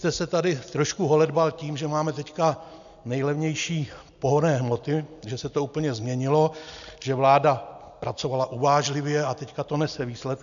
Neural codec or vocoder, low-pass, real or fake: none; 7.2 kHz; real